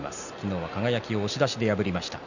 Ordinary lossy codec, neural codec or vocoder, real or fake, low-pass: none; none; real; 7.2 kHz